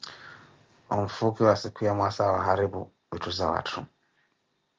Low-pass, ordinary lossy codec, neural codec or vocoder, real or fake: 7.2 kHz; Opus, 24 kbps; none; real